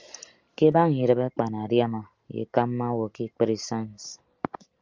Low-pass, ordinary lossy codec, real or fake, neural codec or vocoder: 7.2 kHz; Opus, 32 kbps; real; none